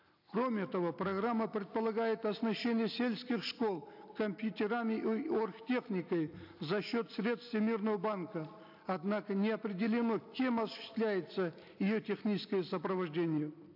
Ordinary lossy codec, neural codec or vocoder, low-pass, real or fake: AAC, 48 kbps; none; 5.4 kHz; real